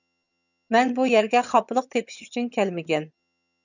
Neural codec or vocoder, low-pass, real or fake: vocoder, 22.05 kHz, 80 mel bands, HiFi-GAN; 7.2 kHz; fake